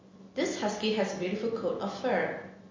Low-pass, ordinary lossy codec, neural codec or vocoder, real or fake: 7.2 kHz; MP3, 32 kbps; none; real